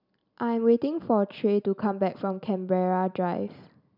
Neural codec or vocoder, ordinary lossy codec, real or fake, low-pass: none; none; real; 5.4 kHz